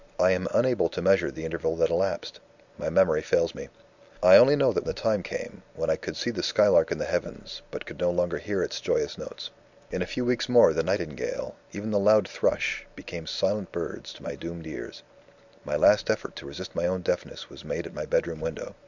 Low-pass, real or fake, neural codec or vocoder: 7.2 kHz; real; none